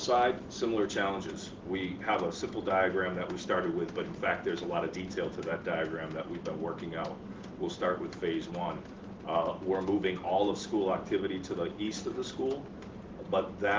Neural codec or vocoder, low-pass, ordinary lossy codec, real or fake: none; 7.2 kHz; Opus, 16 kbps; real